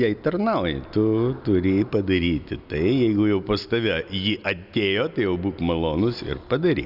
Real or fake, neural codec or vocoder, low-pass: real; none; 5.4 kHz